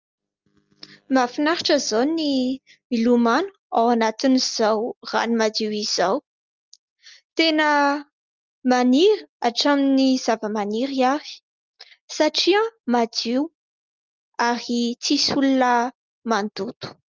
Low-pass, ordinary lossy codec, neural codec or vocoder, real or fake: 7.2 kHz; Opus, 32 kbps; none; real